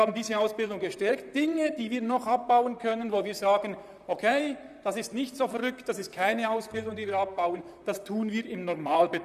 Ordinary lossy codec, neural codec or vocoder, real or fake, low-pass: none; vocoder, 44.1 kHz, 128 mel bands, Pupu-Vocoder; fake; 14.4 kHz